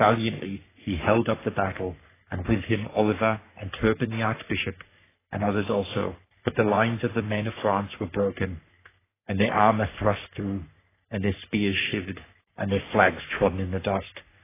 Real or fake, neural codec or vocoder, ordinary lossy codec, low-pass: fake; codec, 44.1 kHz, 3.4 kbps, Pupu-Codec; AAC, 16 kbps; 3.6 kHz